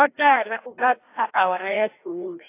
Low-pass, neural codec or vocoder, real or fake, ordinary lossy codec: 3.6 kHz; codec, 16 kHz, 1 kbps, FreqCodec, larger model; fake; AAC, 24 kbps